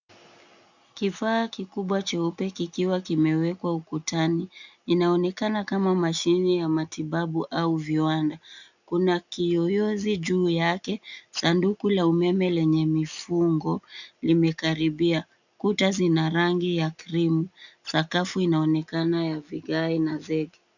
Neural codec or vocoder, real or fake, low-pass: none; real; 7.2 kHz